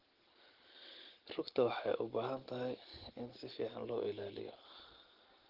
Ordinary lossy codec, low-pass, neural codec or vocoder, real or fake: Opus, 16 kbps; 5.4 kHz; none; real